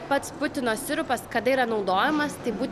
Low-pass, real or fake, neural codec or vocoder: 14.4 kHz; real; none